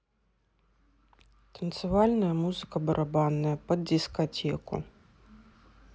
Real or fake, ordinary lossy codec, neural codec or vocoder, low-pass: real; none; none; none